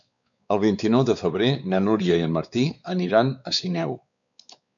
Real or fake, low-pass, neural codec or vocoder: fake; 7.2 kHz; codec, 16 kHz, 4 kbps, X-Codec, WavLM features, trained on Multilingual LibriSpeech